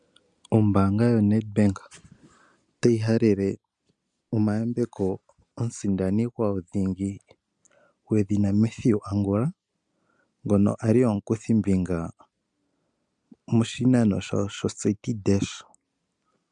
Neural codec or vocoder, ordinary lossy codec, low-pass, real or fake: none; MP3, 96 kbps; 9.9 kHz; real